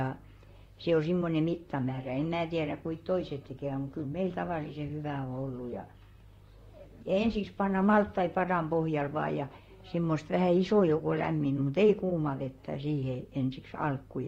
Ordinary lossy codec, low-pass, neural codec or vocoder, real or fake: AAC, 48 kbps; 19.8 kHz; vocoder, 44.1 kHz, 128 mel bands, Pupu-Vocoder; fake